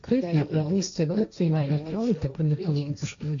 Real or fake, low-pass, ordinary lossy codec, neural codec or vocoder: fake; 7.2 kHz; MP3, 64 kbps; codec, 16 kHz, 1.1 kbps, Voila-Tokenizer